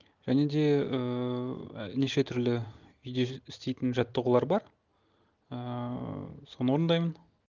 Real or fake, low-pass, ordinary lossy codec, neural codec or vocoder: real; 7.2 kHz; none; none